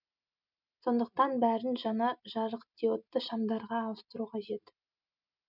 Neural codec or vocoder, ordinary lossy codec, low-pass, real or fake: none; none; 5.4 kHz; real